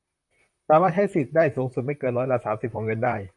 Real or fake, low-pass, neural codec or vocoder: fake; 10.8 kHz; vocoder, 44.1 kHz, 128 mel bands, Pupu-Vocoder